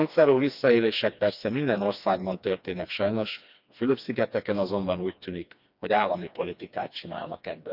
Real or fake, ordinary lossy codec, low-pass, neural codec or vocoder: fake; none; 5.4 kHz; codec, 16 kHz, 2 kbps, FreqCodec, smaller model